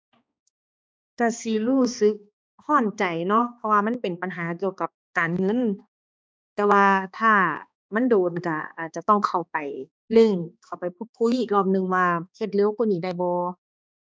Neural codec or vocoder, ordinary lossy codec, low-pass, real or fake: codec, 16 kHz, 2 kbps, X-Codec, HuBERT features, trained on balanced general audio; none; none; fake